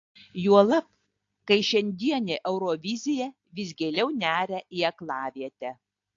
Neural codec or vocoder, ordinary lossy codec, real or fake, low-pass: none; AAC, 48 kbps; real; 7.2 kHz